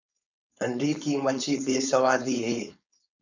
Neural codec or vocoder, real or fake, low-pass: codec, 16 kHz, 4.8 kbps, FACodec; fake; 7.2 kHz